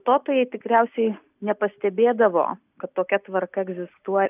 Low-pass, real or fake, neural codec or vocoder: 3.6 kHz; real; none